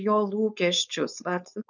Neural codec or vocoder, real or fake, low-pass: codec, 16 kHz, 4.8 kbps, FACodec; fake; 7.2 kHz